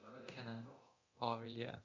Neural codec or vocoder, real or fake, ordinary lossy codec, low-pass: codec, 24 kHz, 0.9 kbps, DualCodec; fake; Opus, 64 kbps; 7.2 kHz